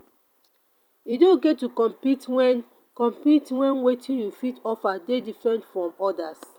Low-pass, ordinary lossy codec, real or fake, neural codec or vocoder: 19.8 kHz; none; fake; vocoder, 44.1 kHz, 128 mel bands every 256 samples, BigVGAN v2